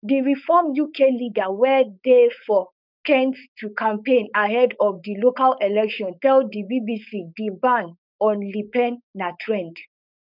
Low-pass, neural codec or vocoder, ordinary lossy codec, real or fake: 5.4 kHz; codec, 16 kHz, 4.8 kbps, FACodec; none; fake